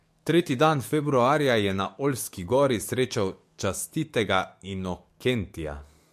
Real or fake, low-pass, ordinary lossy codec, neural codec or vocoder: fake; 14.4 kHz; MP3, 64 kbps; codec, 44.1 kHz, 7.8 kbps, DAC